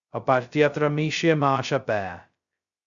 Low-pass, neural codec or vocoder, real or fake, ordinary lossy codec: 7.2 kHz; codec, 16 kHz, 0.2 kbps, FocalCodec; fake; Opus, 64 kbps